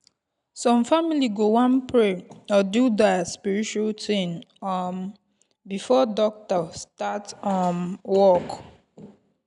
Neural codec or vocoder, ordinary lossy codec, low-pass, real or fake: none; none; 10.8 kHz; real